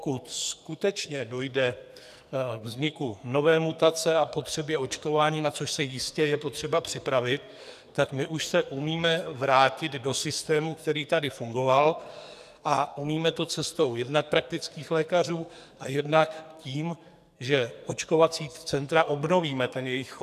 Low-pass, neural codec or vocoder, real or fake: 14.4 kHz; codec, 44.1 kHz, 2.6 kbps, SNAC; fake